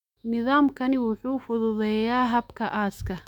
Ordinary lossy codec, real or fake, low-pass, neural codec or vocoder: none; fake; 19.8 kHz; autoencoder, 48 kHz, 128 numbers a frame, DAC-VAE, trained on Japanese speech